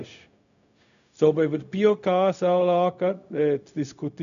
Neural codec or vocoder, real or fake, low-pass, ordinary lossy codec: codec, 16 kHz, 0.4 kbps, LongCat-Audio-Codec; fake; 7.2 kHz; none